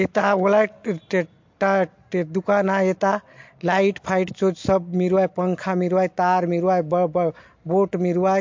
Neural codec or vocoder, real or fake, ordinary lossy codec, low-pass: none; real; MP3, 48 kbps; 7.2 kHz